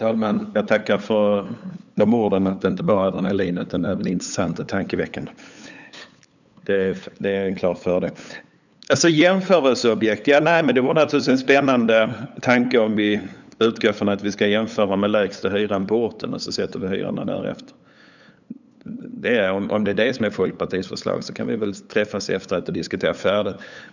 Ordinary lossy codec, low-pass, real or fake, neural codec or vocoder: none; 7.2 kHz; fake; codec, 16 kHz, 8 kbps, FunCodec, trained on LibriTTS, 25 frames a second